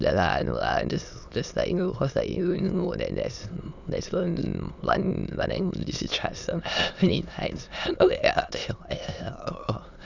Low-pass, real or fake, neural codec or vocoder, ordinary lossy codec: 7.2 kHz; fake; autoencoder, 22.05 kHz, a latent of 192 numbers a frame, VITS, trained on many speakers; none